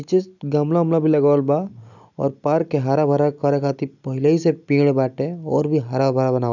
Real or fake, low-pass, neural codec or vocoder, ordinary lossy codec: real; 7.2 kHz; none; none